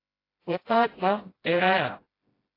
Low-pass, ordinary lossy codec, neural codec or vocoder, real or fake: 5.4 kHz; AAC, 24 kbps; codec, 16 kHz, 0.5 kbps, FreqCodec, smaller model; fake